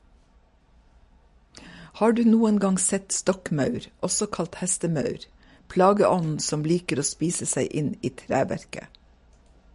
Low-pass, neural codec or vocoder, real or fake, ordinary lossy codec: 14.4 kHz; none; real; MP3, 48 kbps